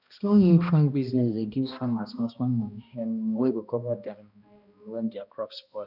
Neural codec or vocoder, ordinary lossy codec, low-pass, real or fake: codec, 16 kHz, 1 kbps, X-Codec, HuBERT features, trained on balanced general audio; none; 5.4 kHz; fake